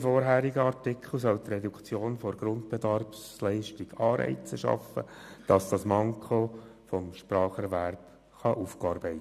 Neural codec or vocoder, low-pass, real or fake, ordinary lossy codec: none; 14.4 kHz; real; none